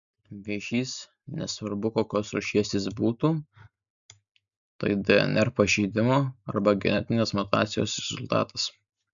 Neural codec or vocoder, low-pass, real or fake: none; 7.2 kHz; real